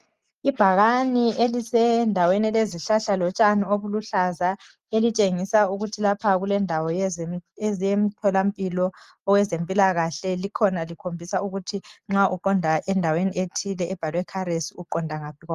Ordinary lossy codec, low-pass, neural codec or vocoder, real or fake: Opus, 32 kbps; 14.4 kHz; none; real